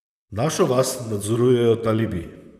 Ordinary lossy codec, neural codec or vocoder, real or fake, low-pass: none; vocoder, 44.1 kHz, 128 mel bands, Pupu-Vocoder; fake; 14.4 kHz